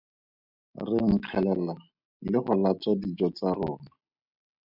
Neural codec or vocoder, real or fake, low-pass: none; real; 5.4 kHz